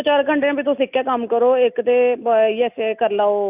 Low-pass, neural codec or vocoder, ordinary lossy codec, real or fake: 3.6 kHz; none; none; real